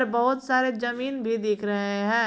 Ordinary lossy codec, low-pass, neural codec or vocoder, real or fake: none; none; none; real